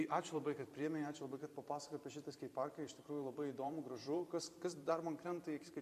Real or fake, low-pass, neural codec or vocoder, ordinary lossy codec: fake; 14.4 kHz; vocoder, 44.1 kHz, 128 mel bands every 512 samples, BigVGAN v2; MP3, 64 kbps